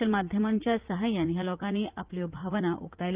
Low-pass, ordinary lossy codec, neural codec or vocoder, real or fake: 3.6 kHz; Opus, 32 kbps; vocoder, 44.1 kHz, 80 mel bands, Vocos; fake